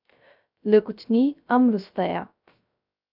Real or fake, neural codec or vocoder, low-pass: fake; codec, 16 kHz, 0.3 kbps, FocalCodec; 5.4 kHz